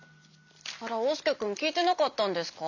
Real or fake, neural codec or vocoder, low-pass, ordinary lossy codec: real; none; 7.2 kHz; none